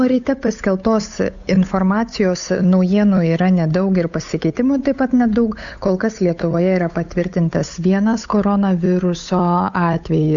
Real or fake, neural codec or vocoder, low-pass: fake; codec, 16 kHz, 8 kbps, FunCodec, trained on Chinese and English, 25 frames a second; 7.2 kHz